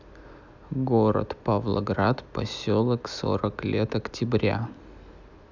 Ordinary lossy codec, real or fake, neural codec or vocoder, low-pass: none; real; none; 7.2 kHz